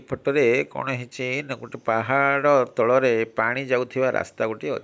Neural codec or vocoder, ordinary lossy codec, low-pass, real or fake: none; none; none; real